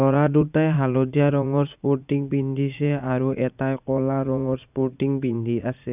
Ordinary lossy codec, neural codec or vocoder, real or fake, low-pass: none; vocoder, 44.1 kHz, 80 mel bands, Vocos; fake; 3.6 kHz